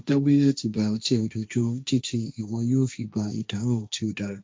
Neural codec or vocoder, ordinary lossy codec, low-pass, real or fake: codec, 16 kHz, 1.1 kbps, Voila-Tokenizer; none; none; fake